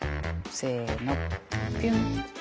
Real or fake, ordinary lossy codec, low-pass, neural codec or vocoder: real; none; none; none